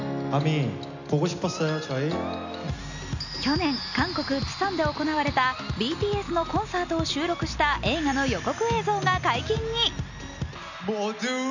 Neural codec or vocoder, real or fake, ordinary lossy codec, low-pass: none; real; none; 7.2 kHz